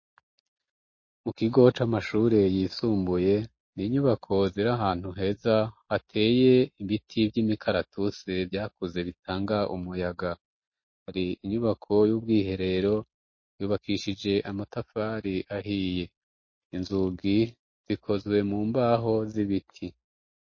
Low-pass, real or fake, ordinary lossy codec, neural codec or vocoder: 7.2 kHz; real; MP3, 32 kbps; none